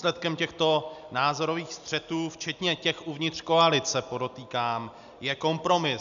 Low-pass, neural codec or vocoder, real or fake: 7.2 kHz; none; real